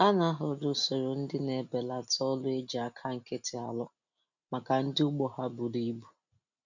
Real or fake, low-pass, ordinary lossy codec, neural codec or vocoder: real; 7.2 kHz; none; none